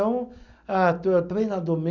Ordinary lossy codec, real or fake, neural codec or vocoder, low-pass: none; real; none; 7.2 kHz